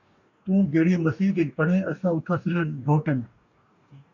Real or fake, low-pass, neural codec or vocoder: fake; 7.2 kHz; codec, 44.1 kHz, 2.6 kbps, DAC